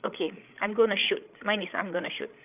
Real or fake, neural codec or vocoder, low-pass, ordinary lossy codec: fake; codec, 16 kHz, 8 kbps, FreqCodec, larger model; 3.6 kHz; none